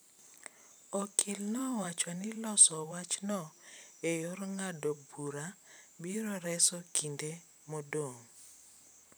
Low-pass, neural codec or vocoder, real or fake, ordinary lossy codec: none; vocoder, 44.1 kHz, 128 mel bands every 512 samples, BigVGAN v2; fake; none